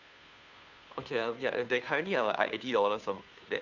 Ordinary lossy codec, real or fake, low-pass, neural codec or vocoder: none; fake; 7.2 kHz; codec, 16 kHz, 2 kbps, FunCodec, trained on Chinese and English, 25 frames a second